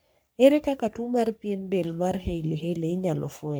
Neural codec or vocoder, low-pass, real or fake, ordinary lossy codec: codec, 44.1 kHz, 3.4 kbps, Pupu-Codec; none; fake; none